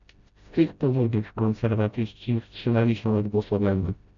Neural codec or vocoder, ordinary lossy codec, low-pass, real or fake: codec, 16 kHz, 0.5 kbps, FreqCodec, smaller model; MP3, 64 kbps; 7.2 kHz; fake